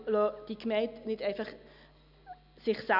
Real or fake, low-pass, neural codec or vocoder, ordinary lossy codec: real; 5.4 kHz; none; none